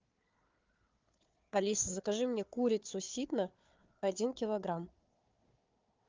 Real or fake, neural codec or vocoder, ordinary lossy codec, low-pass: fake; codec, 16 kHz, 4 kbps, FunCodec, trained on Chinese and English, 50 frames a second; Opus, 32 kbps; 7.2 kHz